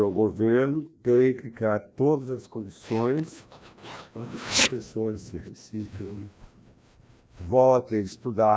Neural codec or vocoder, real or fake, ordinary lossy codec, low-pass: codec, 16 kHz, 1 kbps, FreqCodec, larger model; fake; none; none